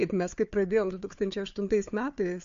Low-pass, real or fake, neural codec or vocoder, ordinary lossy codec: 7.2 kHz; fake; codec, 16 kHz, 4 kbps, FunCodec, trained on Chinese and English, 50 frames a second; MP3, 48 kbps